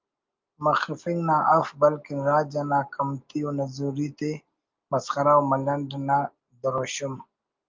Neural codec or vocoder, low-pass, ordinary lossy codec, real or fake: none; 7.2 kHz; Opus, 32 kbps; real